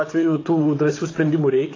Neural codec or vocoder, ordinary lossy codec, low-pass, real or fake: vocoder, 44.1 kHz, 128 mel bands, Pupu-Vocoder; AAC, 32 kbps; 7.2 kHz; fake